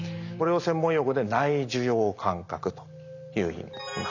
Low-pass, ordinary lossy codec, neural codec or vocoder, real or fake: 7.2 kHz; none; none; real